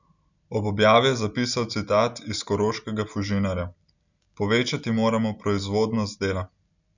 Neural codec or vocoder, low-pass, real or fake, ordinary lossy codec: none; 7.2 kHz; real; none